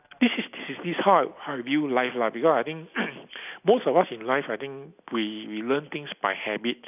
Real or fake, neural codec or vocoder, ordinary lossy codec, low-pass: real; none; none; 3.6 kHz